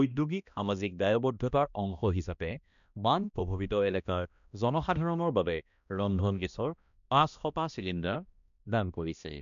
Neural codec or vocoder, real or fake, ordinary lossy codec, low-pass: codec, 16 kHz, 1 kbps, X-Codec, HuBERT features, trained on balanced general audio; fake; none; 7.2 kHz